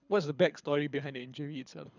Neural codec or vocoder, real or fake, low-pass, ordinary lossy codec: codec, 24 kHz, 6 kbps, HILCodec; fake; 7.2 kHz; none